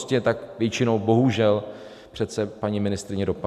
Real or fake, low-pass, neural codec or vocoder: real; 14.4 kHz; none